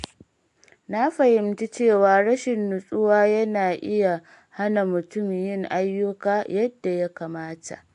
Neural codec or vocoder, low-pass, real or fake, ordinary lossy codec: none; 10.8 kHz; real; AAC, 64 kbps